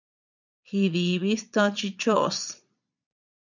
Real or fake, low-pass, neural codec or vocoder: fake; 7.2 kHz; vocoder, 22.05 kHz, 80 mel bands, Vocos